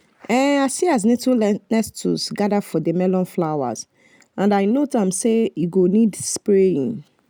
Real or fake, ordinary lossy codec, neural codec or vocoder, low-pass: real; none; none; none